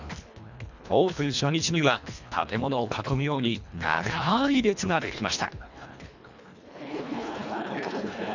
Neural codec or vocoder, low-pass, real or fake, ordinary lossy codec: codec, 24 kHz, 1.5 kbps, HILCodec; 7.2 kHz; fake; none